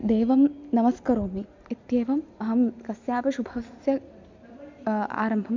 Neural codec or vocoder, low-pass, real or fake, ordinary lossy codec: none; 7.2 kHz; real; MP3, 64 kbps